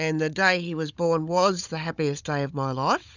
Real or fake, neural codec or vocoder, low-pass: fake; codec, 16 kHz, 16 kbps, FunCodec, trained on Chinese and English, 50 frames a second; 7.2 kHz